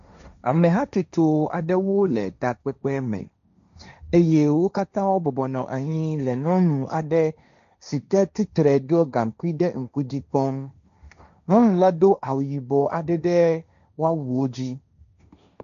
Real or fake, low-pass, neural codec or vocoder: fake; 7.2 kHz; codec, 16 kHz, 1.1 kbps, Voila-Tokenizer